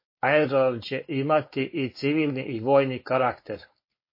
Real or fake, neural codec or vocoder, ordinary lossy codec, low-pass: fake; codec, 16 kHz, 4.8 kbps, FACodec; MP3, 24 kbps; 5.4 kHz